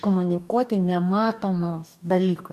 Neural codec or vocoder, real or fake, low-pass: codec, 44.1 kHz, 2.6 kbps, DAC; fake; 14.4 kHz